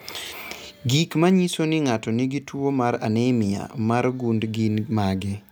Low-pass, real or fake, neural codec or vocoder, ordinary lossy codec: none; real; none; none